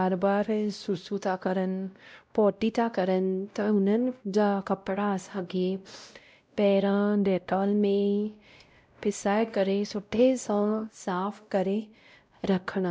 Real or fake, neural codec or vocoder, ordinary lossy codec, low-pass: fake; codec, 16 kHz, 0.5 kbps, X-Codec, WavLM features, trained on Multilingual LibriSpeech; none; none